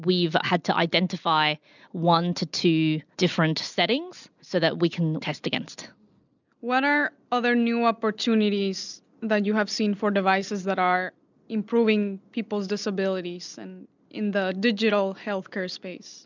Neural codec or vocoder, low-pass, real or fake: none; 7.2 kHz; real